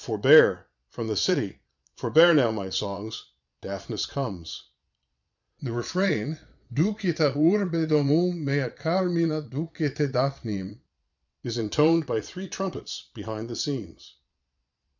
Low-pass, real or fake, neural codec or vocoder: 7.2 kHz; fake; vocoder, 22.05 kHz, 80 mel bands, Vocos